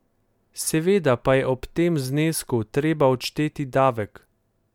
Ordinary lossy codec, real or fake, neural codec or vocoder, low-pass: MP3, 96 kbps; real; none; 19.8 kHz